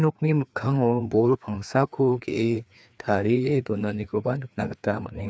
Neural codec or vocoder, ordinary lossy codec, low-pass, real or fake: codec, 16 kHz, 2 kbps, FreqCodec, larger model; none; none; fake